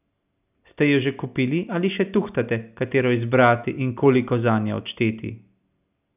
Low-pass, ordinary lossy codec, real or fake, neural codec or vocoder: 3.6 kHz; none; real; none